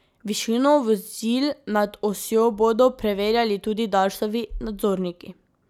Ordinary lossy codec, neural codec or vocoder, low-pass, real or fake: none; none; 19.8 kHz; real